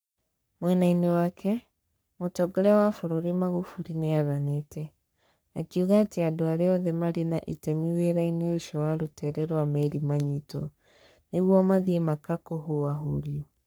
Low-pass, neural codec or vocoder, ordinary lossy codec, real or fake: none; codec, 44.1 kHz, 3.4 kbps, Pupu-Codec; none; fake